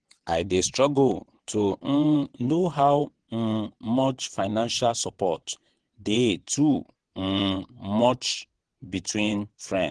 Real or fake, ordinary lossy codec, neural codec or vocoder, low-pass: fake; Opus, 16 kbps; vocoder, 22.05 kHz, 80 mel bands, WaveNeXt; 9.9 kHz